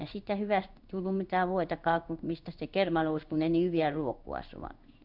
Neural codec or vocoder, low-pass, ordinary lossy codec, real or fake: codec, 16 kHz in and 24 kHz out, 1 kbps, XY-Tokenizer; 5.4 kHz; none; fake